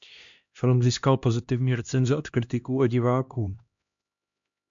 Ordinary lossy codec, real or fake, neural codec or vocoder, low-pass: MP3, 64 kbps; fake; codec, 16 kHz, 1 kbps, X-Codec, HuBERT features, trained on LibriSpeech; 7.2 kHz